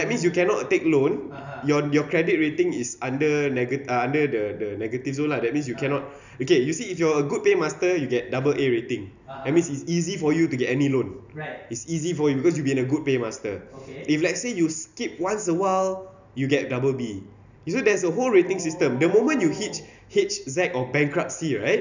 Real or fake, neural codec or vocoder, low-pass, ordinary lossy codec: real; none; 7.2 kHz; none